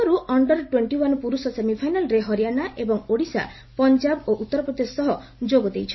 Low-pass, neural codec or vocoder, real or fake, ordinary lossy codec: 7.2 kHz; none; real; MP3, 24 kbps